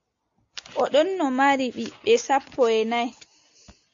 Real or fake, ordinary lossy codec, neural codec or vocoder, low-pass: real; MP3, 48 kbps; none; 7.2 kHz